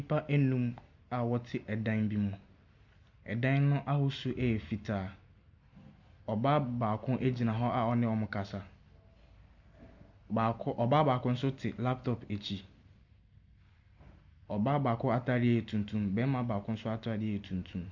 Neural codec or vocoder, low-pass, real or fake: none; 7.2 kHz; real